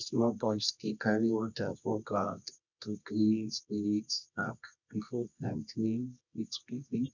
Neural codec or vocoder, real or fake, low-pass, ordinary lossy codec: codec, 24 kHz, 0.9 kbps, WavTokenizer, medium music audio release; fake; 7.2 kHz; none